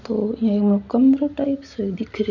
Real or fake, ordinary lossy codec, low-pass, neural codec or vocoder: real; none; 7.2 kHz; none